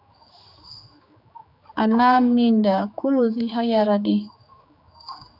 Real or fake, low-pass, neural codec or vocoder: fake; 5.4 kHz; codec, 16 kHz, 4 kbps, X-Codec, HuBERT features, trained on general audio